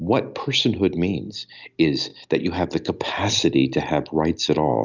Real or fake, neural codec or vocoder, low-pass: real; none; 7.2 kHz